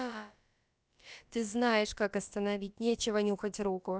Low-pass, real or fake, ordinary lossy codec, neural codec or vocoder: none; fake; none; codec, 16 kHz, about 1 kbps, DyCAST, with the encoder's durations